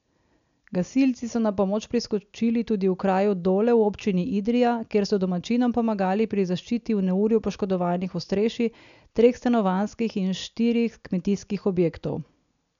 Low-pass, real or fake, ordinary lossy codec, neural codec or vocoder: 7.2 kHz; real; none; none